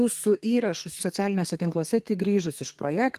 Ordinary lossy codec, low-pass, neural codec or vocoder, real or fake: Opus, 24 kbps; 14.4 kHz; codec, 32 kHz, 1.9 kbps, SNAC; fake